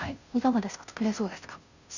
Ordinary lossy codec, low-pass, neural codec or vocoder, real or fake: none; 7.2 kHz; codec, 16 kHz, 0.5 kbps, FunCodec, trained on Chinese and English, 25 frames a second; fake